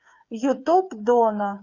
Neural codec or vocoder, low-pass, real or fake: codec, 16 kHz, 8 kbps, FreqCodec, smaller model; 7.2 kHz; fake